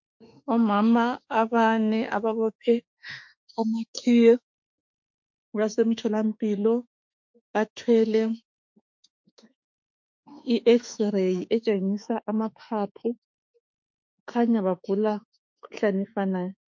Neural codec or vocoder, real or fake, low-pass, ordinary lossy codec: autoencoder, 48 kHz, 32 numbers a frame, DAC-VAE, trained on Japanese speech; fake; 7.2 kHz; MP3, 48 kbps